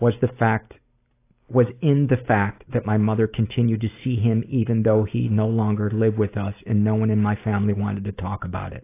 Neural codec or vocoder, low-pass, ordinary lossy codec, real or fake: none; 3.6 kHz; AAC, 24 kbps; real